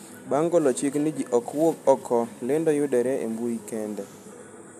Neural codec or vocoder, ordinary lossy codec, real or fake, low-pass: none; none; real; 14.4 kHz